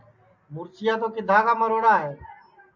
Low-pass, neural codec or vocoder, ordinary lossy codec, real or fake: 7.2 kHz; none; AAC, 48 kbps; real